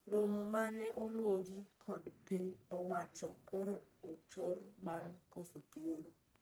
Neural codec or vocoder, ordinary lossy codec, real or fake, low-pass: codec, 44.1 kHz, 1.7 kbps, Pupu-Codec; none; fake; none